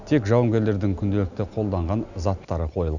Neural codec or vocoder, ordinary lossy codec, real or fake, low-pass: none; none; real; 7.2 kHz